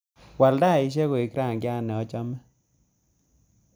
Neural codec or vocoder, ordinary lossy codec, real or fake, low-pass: none; none; real; none